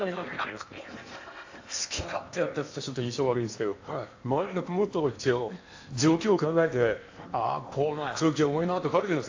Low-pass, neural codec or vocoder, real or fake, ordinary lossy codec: 7.2 kHz; codec, 16 kHz in and 24 kHz out, 0.8 kbps, FocalCodec, streaming, 65536 codes; fake; AAC, 48 kbps